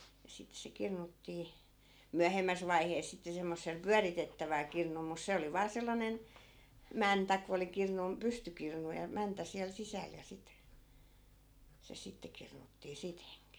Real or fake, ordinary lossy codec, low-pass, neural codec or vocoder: real; none; none; none